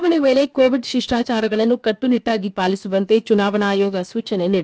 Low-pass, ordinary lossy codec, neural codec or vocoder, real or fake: none; none; codec, 16 kHz, about 1 kbps, DyCAST, with the encoder's durations; fake